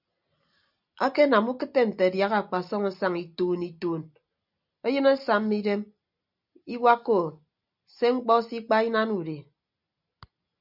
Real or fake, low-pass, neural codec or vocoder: real; 5.4 kHz; none